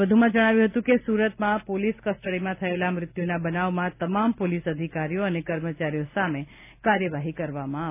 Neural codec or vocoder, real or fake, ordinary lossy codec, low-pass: none; real; none; 3.6 kHz